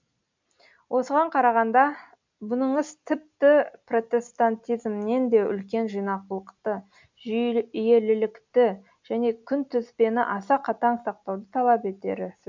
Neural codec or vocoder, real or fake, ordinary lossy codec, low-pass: none; real; MP3, 64 kbps; 7.2 kHz